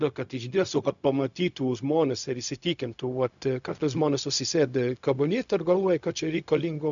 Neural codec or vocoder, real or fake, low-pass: codec, 16 kHz, 0.4 kbps, LongCat-Audio-Codec; fake; 7.2 kHz